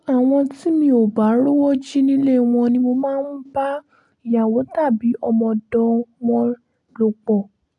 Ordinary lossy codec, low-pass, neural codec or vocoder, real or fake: AAC, 64 kbps; 10.8 kHz; none; real